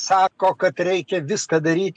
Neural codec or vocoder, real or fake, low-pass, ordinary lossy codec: none; real; 9.9 kHz; MP3, 64 kbps